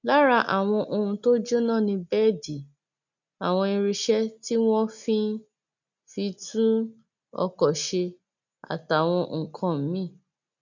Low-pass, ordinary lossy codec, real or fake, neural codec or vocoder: 7.2 kHz; none; real; none